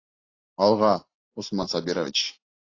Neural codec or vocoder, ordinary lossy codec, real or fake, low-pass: none; AAC, 32 kbps; real; 7.2 kHz